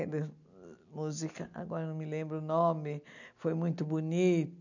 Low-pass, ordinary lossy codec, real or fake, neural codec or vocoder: 7.2 kHz; none; real; none